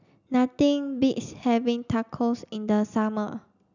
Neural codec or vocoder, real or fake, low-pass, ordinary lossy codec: none; real; 7.2 kHz; none